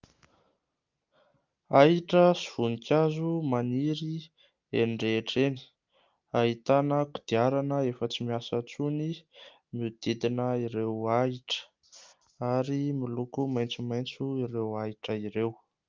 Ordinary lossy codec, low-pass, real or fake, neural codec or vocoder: Opus, 24 kbps; 7.2 kHz; real; none